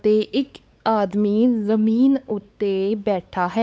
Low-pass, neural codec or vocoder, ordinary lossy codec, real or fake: none; codec, 16 kHz, 4 kbps, X-Codec, WavLM features, trained on Multilingual LibriSpeech; none; fake